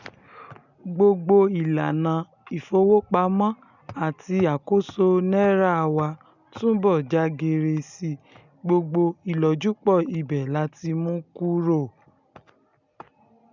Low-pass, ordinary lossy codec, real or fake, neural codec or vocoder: 7.2 kHz; none; real; none